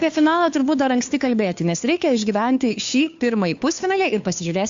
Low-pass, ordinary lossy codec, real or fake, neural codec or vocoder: 7.2 kHz; AAC, 64 kbps; fake; codec, 16 kHz, 2 kbps, FunCodec, trained on Chinese and English, 25 frames a second